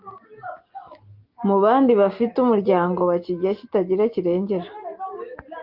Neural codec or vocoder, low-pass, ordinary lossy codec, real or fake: none; 5.4 kHz; Opus, 32 kbps; real